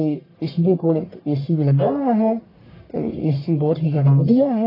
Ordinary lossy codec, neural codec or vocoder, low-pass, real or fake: AAC, 24 kbps; codec, 44.1 kHz, 1.7 kbps, Pupu-Codec; 5.4 kHz; fake